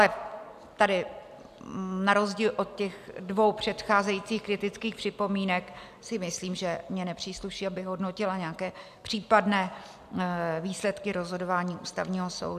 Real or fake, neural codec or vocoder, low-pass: real; none; 14.4 kHz